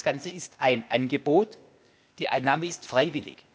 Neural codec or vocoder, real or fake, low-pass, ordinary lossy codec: codec, 16 kHz, 0.8 kbps, ZipCodec; fake; none; none